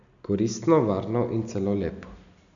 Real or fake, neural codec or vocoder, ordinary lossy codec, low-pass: real; none; MP3, 96 kbps; 7.2 kHz